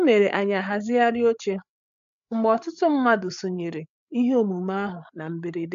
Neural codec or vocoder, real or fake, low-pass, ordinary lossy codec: codec, 16 kHz, 8 kbps, FreqCodec, larger model; fake; 7.2 kHz; MP3, 64 kbps